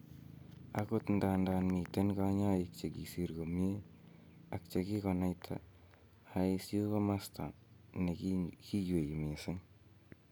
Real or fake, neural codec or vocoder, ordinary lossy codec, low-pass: real; none; none; none